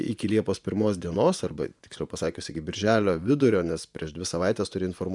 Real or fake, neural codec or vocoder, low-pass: fake; vocoder, 24 kHz, 100 mel bands, Vocos; 10.8 kHz